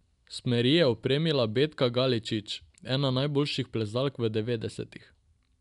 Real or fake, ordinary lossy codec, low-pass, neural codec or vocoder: real; none; 10.8 kHz; none